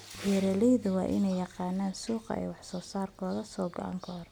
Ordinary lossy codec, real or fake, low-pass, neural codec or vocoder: none; real; none; none